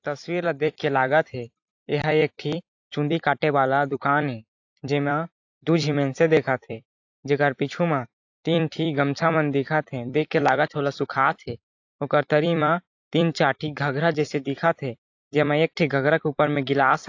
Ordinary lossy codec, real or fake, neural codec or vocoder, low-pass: AAC, 48 kbps; fake; vocoder, 44.1 kHz, 128 mel bands every 256 samples, BigVGAN v2; 7.2 kHz